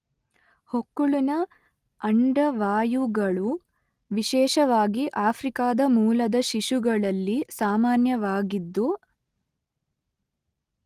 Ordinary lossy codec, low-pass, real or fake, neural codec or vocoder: Opus, 24 kbps; 14.4 kHz; real; none